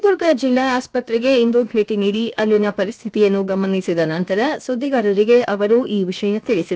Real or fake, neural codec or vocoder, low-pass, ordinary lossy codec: fake; codec, 16 kHz, about 1 kbps, DyCAST, with the encoder's durations; none; none